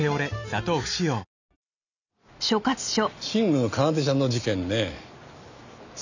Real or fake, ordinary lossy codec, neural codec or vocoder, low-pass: real; none; none; 7.2 kHz